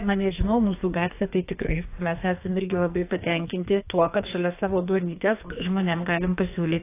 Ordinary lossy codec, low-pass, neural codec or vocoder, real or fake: AAC, 24 kbps; 3.6 kHz; codec, 44.1 kHz, 2.6 kbps, SNAC; fake